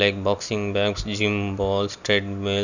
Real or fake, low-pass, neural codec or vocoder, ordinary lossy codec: real; 7.2 kHz; none; none